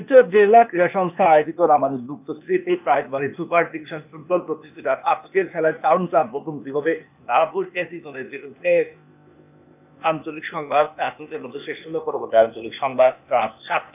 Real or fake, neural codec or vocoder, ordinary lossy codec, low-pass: fake; codec, 16 kHz, 0.8 kbps, ZipCodec; none; 3.6 kHz